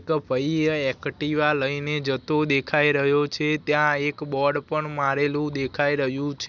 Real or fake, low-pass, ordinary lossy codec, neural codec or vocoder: real; 7.2 kHz; none; none